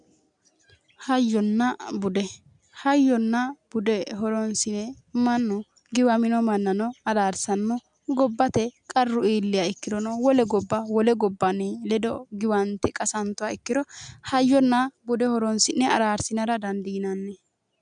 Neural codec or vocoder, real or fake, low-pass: none; real; 9.9 kHz